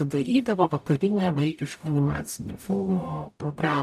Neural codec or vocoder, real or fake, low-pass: codec, 44.1 kHz, 0.9 kbps, DAC; fake; 14.4 kHz